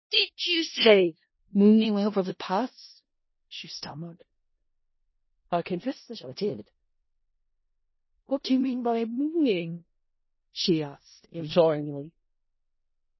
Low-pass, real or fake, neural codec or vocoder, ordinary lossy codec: 7.2 kHz; fake; codec, 16 kHz in and 24 kHz out, 0.4 kbps, LongCat-Audio-Codec, four codebook decoder; MP3, 24 kbps